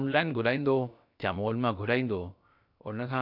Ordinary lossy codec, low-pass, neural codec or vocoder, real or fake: none; 5.4 kHz; codec, 16 kHz, about 1 kbps, DyCAST, with the encoder's durations; fake